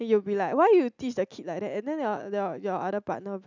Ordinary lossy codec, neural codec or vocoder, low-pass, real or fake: none; none; 7.2 kHz; real